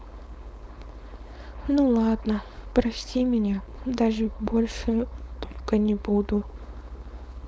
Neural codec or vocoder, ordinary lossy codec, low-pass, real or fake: codec, 16 kHz, 4.8 kbps, FACodec; none; none; fake